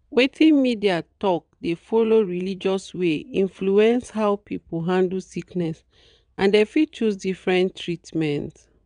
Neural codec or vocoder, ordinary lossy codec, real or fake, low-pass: vocoder, 22.05 kHz, 80 mel bands, WaveNeXt; none; fake; 9.9 kHz